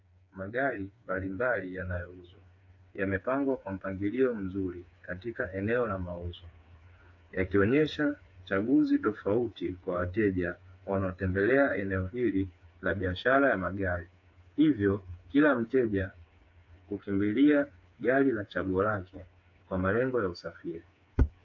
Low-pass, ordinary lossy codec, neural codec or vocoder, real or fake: 7.2 kHz; AAC, 48 kbps; codec, 16 kHz, 4 kbps, FreqCodec, smaller model; fake